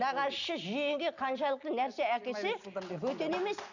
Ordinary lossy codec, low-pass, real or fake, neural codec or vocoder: none; 7.2 kHz; real; none